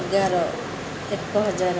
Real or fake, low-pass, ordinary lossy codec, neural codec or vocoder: real; none; none; none